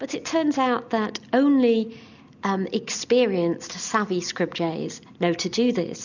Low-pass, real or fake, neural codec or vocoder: 7.2 kHz; real; none